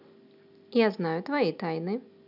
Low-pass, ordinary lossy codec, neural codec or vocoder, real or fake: 5.4 kHz; none; none; real